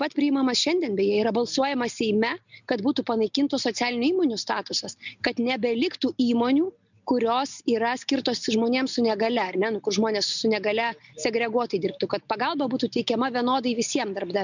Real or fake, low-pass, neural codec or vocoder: real; 7.2 kHz; none